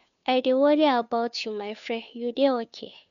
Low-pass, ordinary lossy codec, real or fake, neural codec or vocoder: 7.2 kHz; none; fake; codec, 16 kHz, 2 kbps, FunCodec, trained on Chinese and English, 25 frames a second